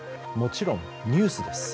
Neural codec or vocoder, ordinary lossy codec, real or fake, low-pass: none; none; real; none